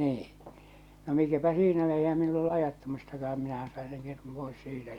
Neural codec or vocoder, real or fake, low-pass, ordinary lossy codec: none; real; 19.8 kHz; Opus, 64 kbps